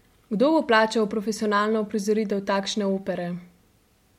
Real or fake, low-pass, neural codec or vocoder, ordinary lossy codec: real; 19.8 kHz; none; MP3, 64 kbps